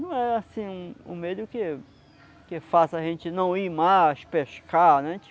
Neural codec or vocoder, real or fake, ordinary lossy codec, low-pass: none; real; none; none